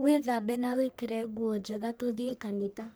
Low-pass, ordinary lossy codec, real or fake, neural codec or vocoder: none; none; fake; codec, 44.1 kHz, 1.7 kbps, Pupu-Codec